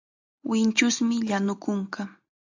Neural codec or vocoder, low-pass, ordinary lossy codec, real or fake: none; 7.2 kHz; AAC, 48 kbps; real